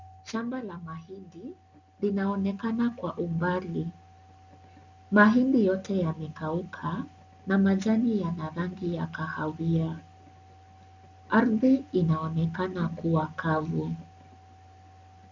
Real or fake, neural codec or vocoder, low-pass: real; none; 7.2 kHz